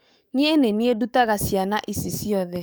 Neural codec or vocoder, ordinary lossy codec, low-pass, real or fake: codec, 44.1 kHz, 7.8 kbps, DAC; none; none; fake